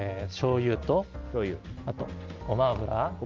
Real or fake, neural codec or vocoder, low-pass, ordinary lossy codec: real; none; 7.2 kHz; Opus, 32 kbps